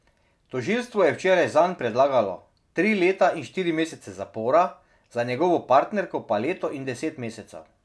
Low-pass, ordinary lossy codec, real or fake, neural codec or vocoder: none; none; real; none